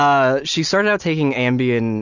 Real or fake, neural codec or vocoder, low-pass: real; none; 7.2 kHz